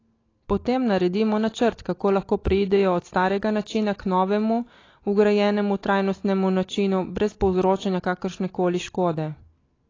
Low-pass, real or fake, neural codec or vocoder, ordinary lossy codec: 7.2 kHz; real; none; AAC, 32 kbps